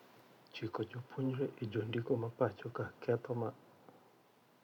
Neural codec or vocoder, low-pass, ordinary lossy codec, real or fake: vocoder, 44.1 kHz, 128 mel bands every 512 samples, BigVGAN v2; 19.8 kHz; none; fake